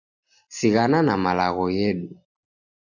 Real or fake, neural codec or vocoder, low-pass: real; none; 7.2 kHz